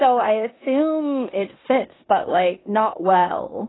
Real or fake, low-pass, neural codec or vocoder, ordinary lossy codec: fake; 7.2 kHz; codec, 16 kHz in and 24 kHz out, 0.9 kbps, LongCat-Audio-Codec, four codebook decoder; AAC, 16 kbps